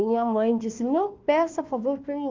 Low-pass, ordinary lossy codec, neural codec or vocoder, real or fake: 7.2 kHz; Opus, 24 kbps; codec, 16 kHz, 1 kbps, FunCodec, trained on Chinese and English, 50 frames a second; fake